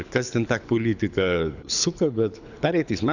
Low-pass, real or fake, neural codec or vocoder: 7.2 kHz; fake; codec, 24 kHz, 6 kbps, HILCodec